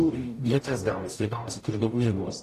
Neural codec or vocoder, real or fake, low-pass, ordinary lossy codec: codec, 44.1 kHz, 0.9 kbps, DAC; fake; 14.4 kHz; AAC, 48 kbps